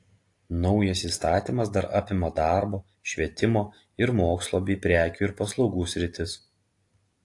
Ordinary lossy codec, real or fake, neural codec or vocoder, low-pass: AAC, 48 kbps; real; none; 10.8 kHz